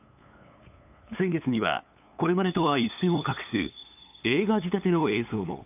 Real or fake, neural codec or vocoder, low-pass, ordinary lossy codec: fake; codec, 16 kHz, 8 kbps, FunCodec, trained on LibriTTS, 25 frames a second; 3.6 kHz; AAC, 32 kbps